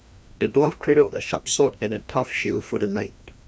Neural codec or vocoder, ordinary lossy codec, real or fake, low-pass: codec, 16 kHz, 1 kbps, FreqCodec, larger model; none; fake; none